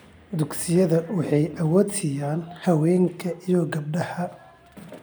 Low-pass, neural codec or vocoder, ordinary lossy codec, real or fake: none; none; none; real